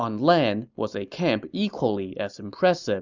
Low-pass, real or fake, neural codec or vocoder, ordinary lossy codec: 7.2 kHz; real; none; Opus, 64 kbps